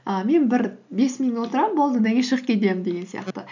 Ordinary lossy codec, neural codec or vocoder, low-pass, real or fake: none; none; 7.2 kHz; real